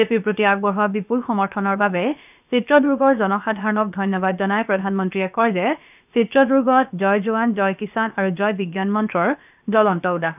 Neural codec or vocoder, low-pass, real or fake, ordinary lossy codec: codec, 16 kHz, about 1 kbps, DyCAST, with the encoder's durations; 3.6 kHz; fake; none